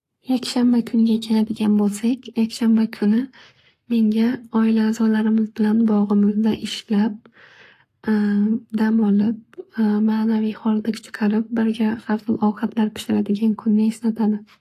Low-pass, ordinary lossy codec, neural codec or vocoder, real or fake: 14.4 kHz; AAC, 64 kbps; codec, 44.1 kHz, 7.8 kbps, Pupu-Codec; fake